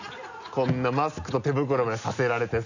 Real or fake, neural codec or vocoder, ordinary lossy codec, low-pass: real; none; none; 7.2 kHz